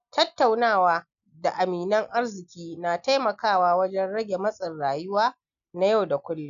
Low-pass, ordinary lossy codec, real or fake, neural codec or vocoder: 7.2 kHz; none; real; none